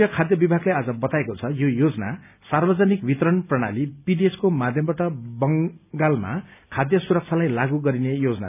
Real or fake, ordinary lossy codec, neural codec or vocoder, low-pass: real; none; none; 3.6 kHz